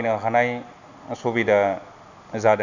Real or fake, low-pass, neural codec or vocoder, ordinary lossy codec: real; 7.2 kHz; none; none